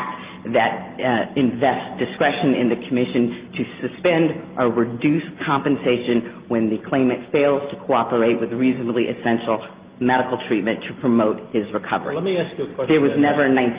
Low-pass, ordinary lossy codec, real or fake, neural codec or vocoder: 3.6 kHz; Opus, 32 kbps; real; none